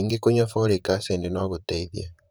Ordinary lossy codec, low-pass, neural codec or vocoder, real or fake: none; none; vocoder, 44.1 kHz, 128 mel bands, Pupu-Vocoder; fake